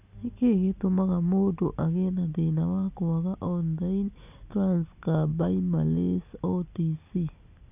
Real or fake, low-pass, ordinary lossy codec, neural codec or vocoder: real; 3.6 kHz; none; none